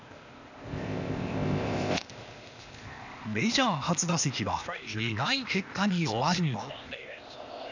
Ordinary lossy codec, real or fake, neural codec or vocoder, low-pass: none; fake; codec, 16 kHz, 0.8 kbps, ZipCodec; 7.2 kHz